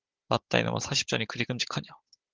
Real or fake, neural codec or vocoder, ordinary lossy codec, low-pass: fake; codec, 16 kHz, 16 kbps, FunCodec, trained on Chinese and English, 50 frames a second; Opus, 16 kbps; 7.2 kHz